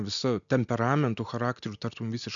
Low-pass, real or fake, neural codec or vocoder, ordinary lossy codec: 7.2 kHz; real; none; MP3, 96 kbps